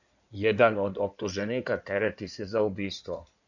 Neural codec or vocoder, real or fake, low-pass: codec, 16 kHz in and 24 kHz out, 2.2 kbps, FireRedTTS-2 codec; fake; 7.2 kHz